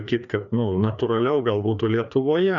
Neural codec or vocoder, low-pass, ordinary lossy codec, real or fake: codec, 16 kHz, 2 kbps, FreqCodec, larger model; 7.2 kHz; MP3, 96 kbps; fake